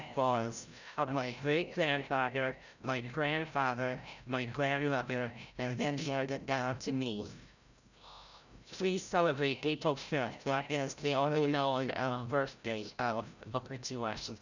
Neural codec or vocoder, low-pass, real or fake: codec, 16 kHz, 0.5 kbps, FreqCodec, larger model; 7.2 kHz; fake